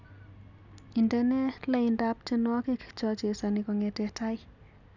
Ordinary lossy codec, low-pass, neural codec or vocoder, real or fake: none; 7.2 kHz; none; real